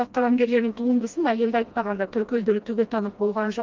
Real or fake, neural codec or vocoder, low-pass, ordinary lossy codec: fake; codec, 16 kHz, 1 kbps, FreqCodec, smaller model; 7.2 kHz; Opus, 32 kbps